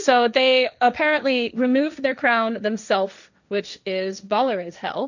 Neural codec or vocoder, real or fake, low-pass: codec, 16 kHz, 1.1 kbps, Voila-Tokenizer; fake; 7.2 kHz